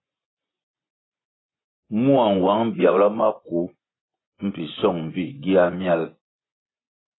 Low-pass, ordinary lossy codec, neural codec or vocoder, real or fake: 7.2 kHz; AAC, 16 kbps; vocoder, 24 kHz, 100 mel bands, Vocos; fake